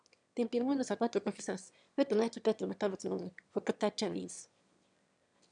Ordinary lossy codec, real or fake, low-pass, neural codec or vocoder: none; fake; 9.9 kHz; autoencoder, 22.05 kHz, a latent of 192 numbers a frame, VITS, trained on one speaker